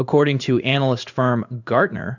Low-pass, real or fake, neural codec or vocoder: 7.2 kHz; fake; codec, 16 kHz in and 24 kHz out, 1 kbps, XY-Tokenizer